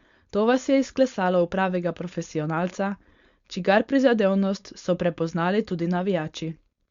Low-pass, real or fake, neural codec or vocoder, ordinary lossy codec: 7.2 kHz; fake; codec, 16 kHz, 4.8 kbps, FACodec; Opus, 64 kbps